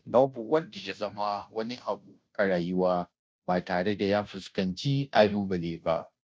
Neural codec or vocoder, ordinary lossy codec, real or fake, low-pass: codec, 16 kHz, 0.5 kbps, FunCodec, trained on Chinese and English, 25 frames a second; none; fake; none